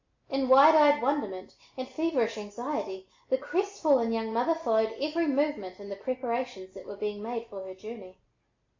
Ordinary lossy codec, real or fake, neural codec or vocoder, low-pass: MP3, 48 kbps; real; none; 7.2 kHz